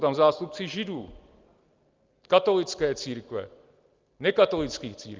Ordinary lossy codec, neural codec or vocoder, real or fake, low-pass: Opus, 32 kbps; none; real; 7.2 kHz